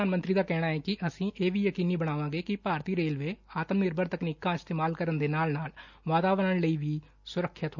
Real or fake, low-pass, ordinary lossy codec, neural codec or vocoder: real; 7.2 kHz; none; none